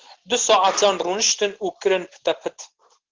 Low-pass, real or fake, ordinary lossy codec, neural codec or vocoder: 7.2 kHz; real; Opus, 16 kbps; none